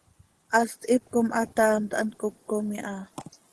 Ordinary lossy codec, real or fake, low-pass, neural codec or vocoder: Opus, 16 kbps; fake; 10.8 kHz; vocoder, 24 kHz, 100 mel bands, Vocos